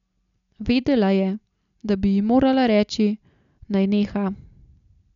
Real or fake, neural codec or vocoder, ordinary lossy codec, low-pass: real; none; none; 7.2 kHz